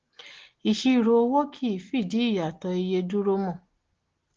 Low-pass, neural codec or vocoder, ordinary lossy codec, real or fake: 7.2 kHz; none; Opus, 16 kbps; real